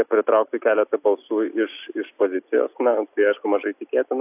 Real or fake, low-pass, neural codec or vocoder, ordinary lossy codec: real; 3.6 kHz; none; AAC, 32 kbps